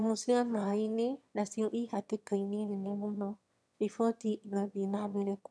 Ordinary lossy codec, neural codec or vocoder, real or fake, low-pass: none; autoencoder, 22.05 kHz, a latent of 192 numbers a frame, VITS, trained on one speaker; fake; none